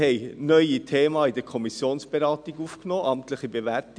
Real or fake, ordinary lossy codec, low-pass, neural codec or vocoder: real; none; 9.9 kHz; none